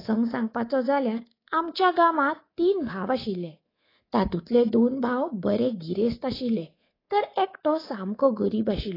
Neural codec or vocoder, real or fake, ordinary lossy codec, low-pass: codec, 24 kHz, 3.1 kbps, DualCodec; fake; AAC, 24 kbps; 5.4 kHz